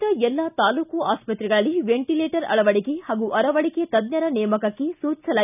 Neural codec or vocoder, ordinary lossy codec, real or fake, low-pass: none; none; real; 3.6 kHz